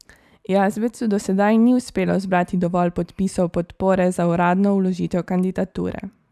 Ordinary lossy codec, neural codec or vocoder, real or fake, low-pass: none; vocoder, 44.1 kHz, 128 mel bands every 256 samples, BigVGAN v2; fake; 14.4 kHz